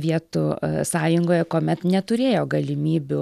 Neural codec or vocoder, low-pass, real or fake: none; 14.4 kHz; real